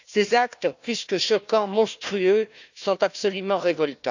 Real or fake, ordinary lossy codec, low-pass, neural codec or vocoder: fake; none; 7.2 kHz; codec, 16 kHz, 1 kbps, FunCodec, trained on Chinese and English, 50 frames a second